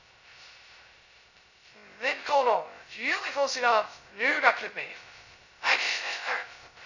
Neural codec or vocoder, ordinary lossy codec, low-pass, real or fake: codec, 16 kHz, 0.2 kbps, FocalCodec; none; 7.2 kHz; fake